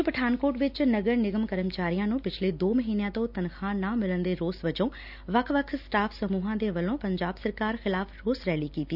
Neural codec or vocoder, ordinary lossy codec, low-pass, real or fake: none; none; 5.4 kHz; real